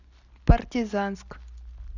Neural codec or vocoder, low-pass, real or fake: none; 7.2 kHz; real